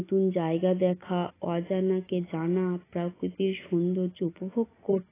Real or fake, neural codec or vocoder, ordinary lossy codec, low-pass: real; none; AAC, 16 kbps; 3.6 kHz